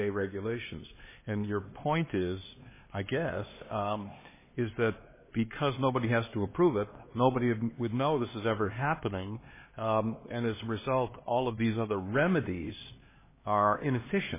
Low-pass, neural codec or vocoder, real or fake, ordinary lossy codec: 3.6 kHz; codec, 16 kHz, 2 kbps, X-Codec, HuBERT features, trained on LibriSpeech; fake; MP3, 16 kbps